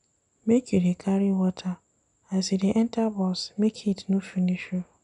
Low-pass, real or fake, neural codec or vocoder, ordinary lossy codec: 10.8 kHz; real; none; none